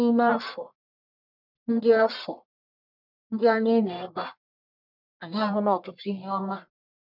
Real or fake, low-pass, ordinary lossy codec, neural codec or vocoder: fake; 5.4 kHz; none; codec, 44.1 kHz, 1.7 kbps, Pupu-Codec